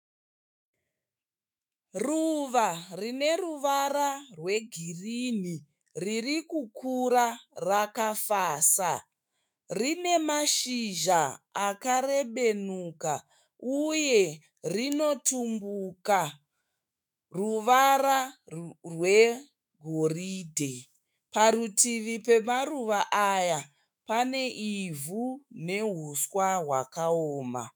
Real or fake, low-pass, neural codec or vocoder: fake; 19.8 kHz; autoencoder, 48 kHz, 128 numbers a frame, DAC-VAE, trained on Japanese speech